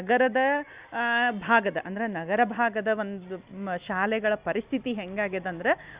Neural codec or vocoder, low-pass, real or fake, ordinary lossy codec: none; 3.6 kHz; real; Opus, 64 kbps